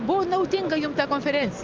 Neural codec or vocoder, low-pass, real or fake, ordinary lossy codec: none; 7.2 kHz; real; Opus, 24 kbps